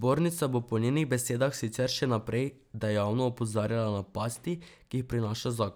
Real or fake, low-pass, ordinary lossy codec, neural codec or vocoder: real; none; none; none